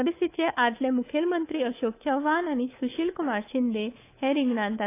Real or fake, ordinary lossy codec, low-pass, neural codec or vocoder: fake; AAC, 24 kbps; 3.6 kHz; codec, 24 kHz, 3.1 kbps, DualCodec